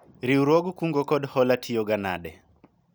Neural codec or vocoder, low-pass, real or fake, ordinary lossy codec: none; none; real; none